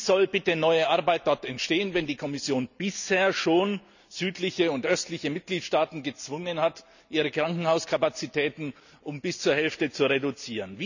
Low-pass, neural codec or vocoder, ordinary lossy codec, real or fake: 7.2 kHz; none; none; real